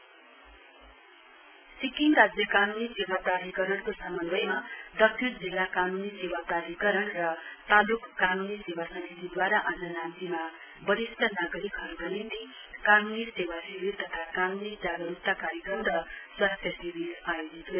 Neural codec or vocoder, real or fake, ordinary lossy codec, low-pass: none; real; none; 3.6 kHz